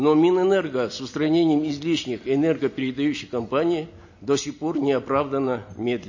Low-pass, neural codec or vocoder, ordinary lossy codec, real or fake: 7.2 kHz; none; MP3, 32 kbps; real